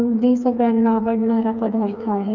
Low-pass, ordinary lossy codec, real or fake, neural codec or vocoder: 7.2 kHz; none; fake; codec, 16 kHz, 4 kbps, FreqCodec, smaller model